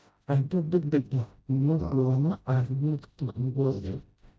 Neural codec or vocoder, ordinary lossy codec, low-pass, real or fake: codec, 16 kHz, 0.5 kbps, FreqCodec, smaller model; none; none; fake